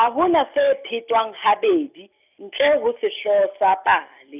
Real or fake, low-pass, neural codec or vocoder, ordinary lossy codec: real; 3.6 kHz; none; none